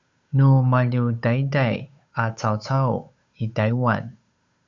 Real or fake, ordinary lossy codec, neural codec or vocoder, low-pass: fake; Opus, 64 kbps; codec, 16 kHz, 8 kbps, FunCodec, trained on Chinese and English, 25 frames a second; 7.2 kHz